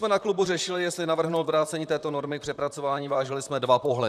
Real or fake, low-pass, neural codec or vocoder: fake; 14.4 kHz; vocoder, 44.1 kHz, 128 mel bands, Pupu-Vocoder